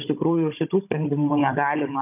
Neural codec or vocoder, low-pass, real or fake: codec, 16 kHz, 16 kbps, FunCodec, trained on Chinese and English, 50 frames a second; 3.6 kHz; fake